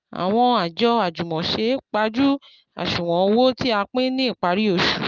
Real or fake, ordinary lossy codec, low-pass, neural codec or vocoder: real; Opus, 24 kbps; 7.2 kHz; none